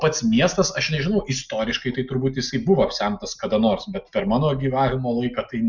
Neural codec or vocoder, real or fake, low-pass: none; real; 7.2 kHz